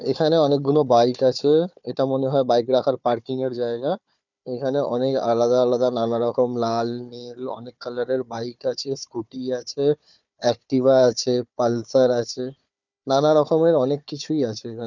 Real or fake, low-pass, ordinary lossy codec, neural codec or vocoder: fake; 7.2 kHz; none; codec, 16 kHz, 4 kbps, FunCodec, trained on Chinese and English, 50 frames a second